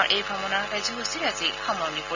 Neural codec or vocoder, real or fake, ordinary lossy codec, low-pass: none; real; Opus, 64 kbps; 7.2 kHz